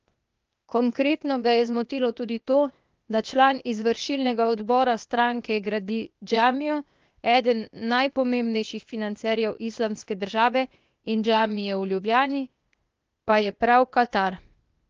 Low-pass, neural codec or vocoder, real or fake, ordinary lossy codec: 7.2 kHz; codec, 16 kHz, 0.8 kbps, ZipCodec; fake; Opus, 24 kbps